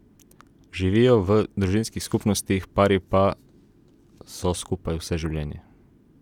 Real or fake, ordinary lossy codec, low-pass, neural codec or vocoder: real; none; 19.8 kHz; none